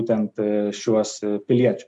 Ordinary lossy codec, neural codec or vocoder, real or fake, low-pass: MP3, 64 kbps; none; real; 10.8 kHz